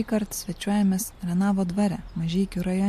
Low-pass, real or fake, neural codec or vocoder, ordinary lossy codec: 14.4 kHz; real; none; MP3, 64 kbps